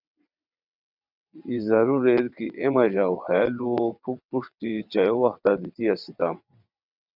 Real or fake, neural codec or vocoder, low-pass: fake; vocoder, 22.05 kHz, 80 mel bands, WaveNeXt; 5.4 kHz